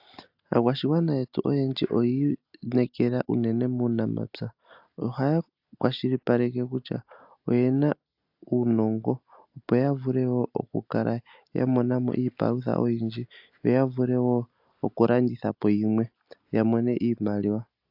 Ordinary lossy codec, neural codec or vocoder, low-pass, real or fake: AAC, 48 kbps; none; 5.4 kHz; real